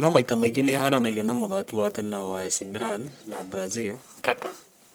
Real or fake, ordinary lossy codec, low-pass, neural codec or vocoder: fake; none; none; codec, 44.1 kHz, 1.7 kbps, Pupu-Codec